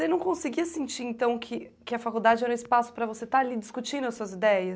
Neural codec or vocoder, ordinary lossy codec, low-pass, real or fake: none; none; none; real